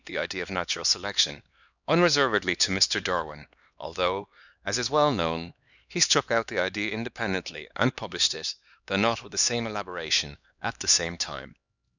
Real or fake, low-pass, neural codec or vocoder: fake; 7.2 kHz; codec, 16 kHz, 2 kbps, X-Codec, HuBERT features, trained on LibriSpeech